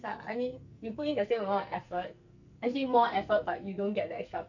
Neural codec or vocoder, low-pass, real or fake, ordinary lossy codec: codec, 44.1 kHz, 2.6 kbps, SNAC; 7.2 kHz; fake; none